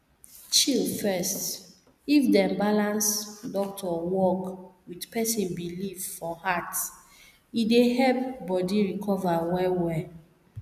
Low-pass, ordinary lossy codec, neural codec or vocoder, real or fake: 14.4 kHz; none; none; real